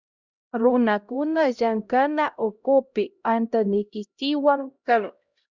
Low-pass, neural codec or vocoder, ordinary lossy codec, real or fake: 7.2 kHz; codec, 16 kHz, 0.5 kbps, X-Codec, HuBERT features, trained on LibriSpeech; Opus, 64 kbps; fake